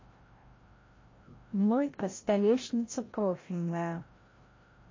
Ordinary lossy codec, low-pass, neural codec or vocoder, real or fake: MP3, 32 kbps; 7.2 kHz; codec, 16 kHz, 0.5 kbps, FreqCodec, larger model; fake